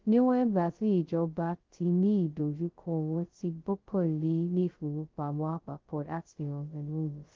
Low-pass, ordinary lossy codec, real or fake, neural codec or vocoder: 7.2 kHz; Opus, 16 kbps; fake; codec, 16 kHz, 0.2 kbps, FocalCodec